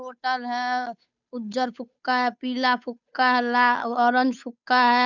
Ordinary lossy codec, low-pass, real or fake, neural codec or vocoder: none; 7.2 kHz; fake; codec, 16 kHz, 8 kbps, FunCodec, trained on Chinese and English, 25 frames a second